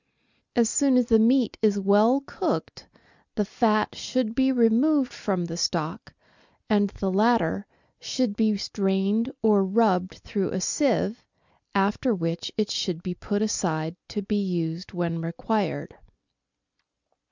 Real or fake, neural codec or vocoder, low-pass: real; none; 7.2 kHz